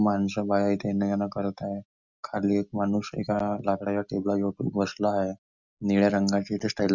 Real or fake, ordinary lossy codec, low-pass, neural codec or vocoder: real; none; 7.2 kHz; none